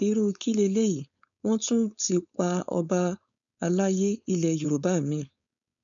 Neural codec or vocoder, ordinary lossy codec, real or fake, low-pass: codec, 16 kHz, 4.8 kbps, FACodec; none; fake; 7.2 kHz